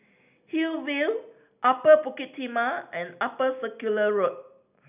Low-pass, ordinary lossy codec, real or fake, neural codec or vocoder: 3.6 kHz; none; real; none